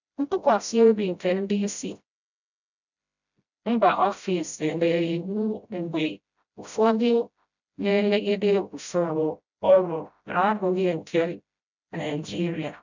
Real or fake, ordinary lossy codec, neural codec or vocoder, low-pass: fake; none; codec, 16 kHz, 0.5 kbps, FreqCodec, smaller model; 7.2 kHz